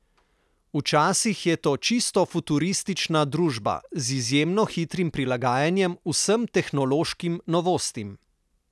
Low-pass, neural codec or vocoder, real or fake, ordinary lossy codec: none; none; real; none